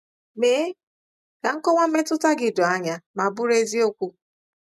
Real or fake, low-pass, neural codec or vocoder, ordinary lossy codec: real; 14.4 kHz; none; MP3, 96 kbps